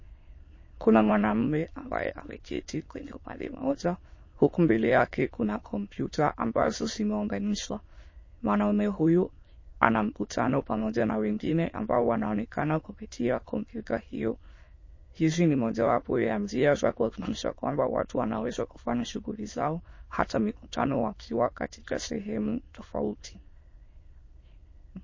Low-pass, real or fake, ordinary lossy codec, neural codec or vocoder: 7.2 kHz; fake; MP3, 32 kbps; autoencoder, 22.05 kHz, a latent of 192 numbers a frame, VITS, trained on many speakers